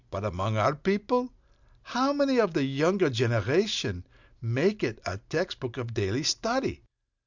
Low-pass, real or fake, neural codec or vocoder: 7.2 kHz; real; none